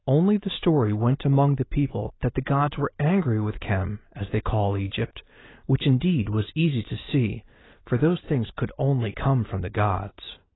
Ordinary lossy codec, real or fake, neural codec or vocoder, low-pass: AAC, 16 kbps; real; none; 7.2 kHz